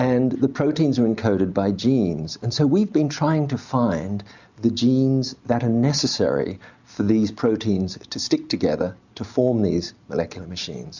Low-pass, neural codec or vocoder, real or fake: 7.2 kHz; none; real